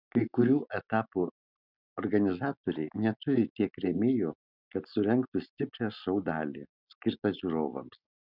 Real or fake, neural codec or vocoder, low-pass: real; none; 5.4 kHz